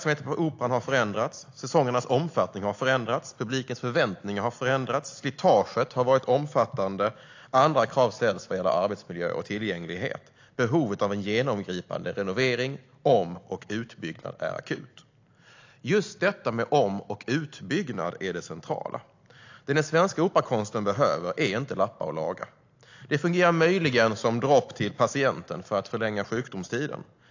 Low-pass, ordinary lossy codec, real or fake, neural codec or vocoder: 7.2 kHz; AAC, 48 kbps; real; none